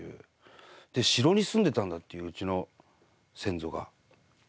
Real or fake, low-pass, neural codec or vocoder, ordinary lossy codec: real; none; none; none